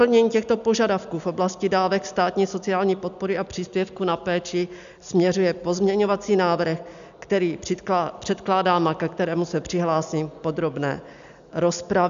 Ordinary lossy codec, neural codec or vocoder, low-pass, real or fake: AAC, 96 kbps; none; 7.2 kHz; real